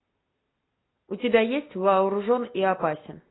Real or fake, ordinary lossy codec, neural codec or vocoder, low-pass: real; AAC, 16 kbps; none; 7.2 kHz